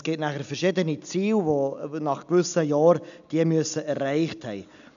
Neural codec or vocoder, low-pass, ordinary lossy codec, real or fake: none; 7.2 kHz; none; real